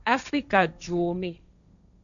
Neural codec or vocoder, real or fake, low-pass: codec, 16 kHz, 1.1 kbps, Voila-Tokenizer; fake; 7.2 kHz